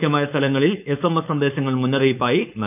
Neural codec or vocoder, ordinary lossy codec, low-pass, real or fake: codec, 16 kHz, 4.8 kbps, FACodec; none; 3.6 kHz; fake